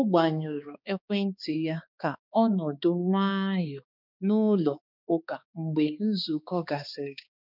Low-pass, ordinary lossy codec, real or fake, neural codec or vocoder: 5.4 kHz; none; fake; codec, 16 kHz, 2 kbps, X-Codec, HuBERT features, trained on balanced general audio